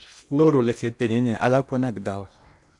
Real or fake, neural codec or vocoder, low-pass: fake; codec, 16 kHz in and 24 kHz out, 0.8 kbps, FocalCodec, streaming, 65536 codes; 10.8 kHz